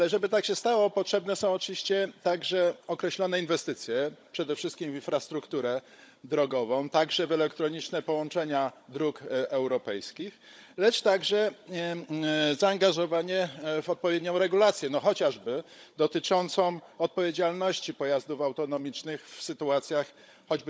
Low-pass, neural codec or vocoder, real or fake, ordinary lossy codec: none; codec, 16 kHz, 16 kbps, FunCodec, trained on Chinese and English, 50 frames a second; fake; none